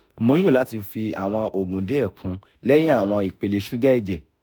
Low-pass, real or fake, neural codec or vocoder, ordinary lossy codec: none; fake; autoencoder, 48 kHz, 32 numbers a frame, DAC-VAE, trained on Japanese speech; none